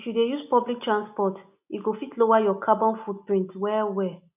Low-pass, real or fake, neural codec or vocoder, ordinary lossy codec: 3.6 kHz; real; none; none